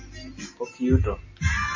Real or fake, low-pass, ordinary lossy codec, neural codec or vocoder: real; 7.2 kHz; MP3, 32 kbps; none